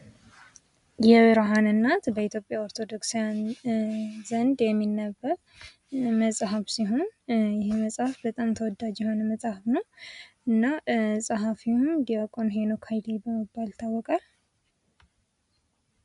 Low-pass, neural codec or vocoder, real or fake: 10.8 kHz; none; real